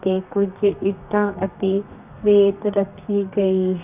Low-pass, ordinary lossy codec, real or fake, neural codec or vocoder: 3.6 kHz; none; fake; codec, 32 kHz, 1.9 kbps, SNAC